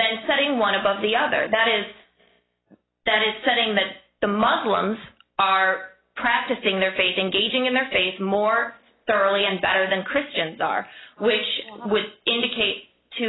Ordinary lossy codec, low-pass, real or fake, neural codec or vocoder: AAC, 16 kbps; 7.2 kHz; real; none